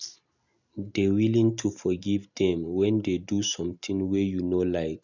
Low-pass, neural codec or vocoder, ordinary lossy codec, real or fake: 7.2 kHz; none; none; real